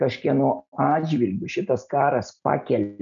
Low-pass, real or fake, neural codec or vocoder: 7.2 kHz; real; none